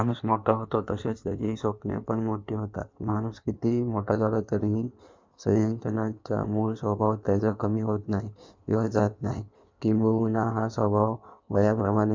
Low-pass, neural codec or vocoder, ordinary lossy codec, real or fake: 7.2 kHz; codec, 16 kHz in and 24 kHz out, 1.1 kbps, FireRedTTS-2 codec; MP3, 64 kbps; fake